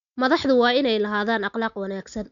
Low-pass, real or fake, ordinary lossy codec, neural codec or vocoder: 7.2 kHz; real; none; none